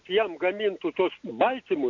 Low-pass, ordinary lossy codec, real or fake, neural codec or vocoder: 7.2 kHz; AAC, 48 kbps; real; none